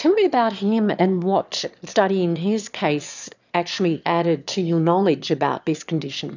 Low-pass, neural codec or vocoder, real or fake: 7.2 kHz; autoencoder, 22.05 kHz, a latent of 192 numbers a frame, VITS, trained on one speaker; fake